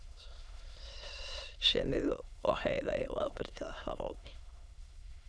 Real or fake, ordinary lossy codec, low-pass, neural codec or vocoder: fake; none; none; autoencoder, 22.05 kHz, a latent of 192 numbers a frame, VITS, trained on many speakers